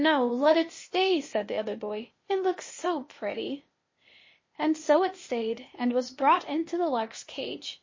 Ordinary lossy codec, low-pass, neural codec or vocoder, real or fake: MP3, 32 kbps; 7.2 kHz; codec, 16 kHz, 0.8 kbps, ZipCodec; fake